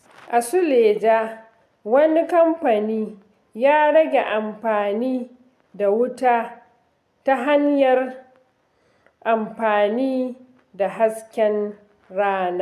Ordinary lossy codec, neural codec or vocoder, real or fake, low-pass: AAC, 96 kbps; none; real; 14.4 kHz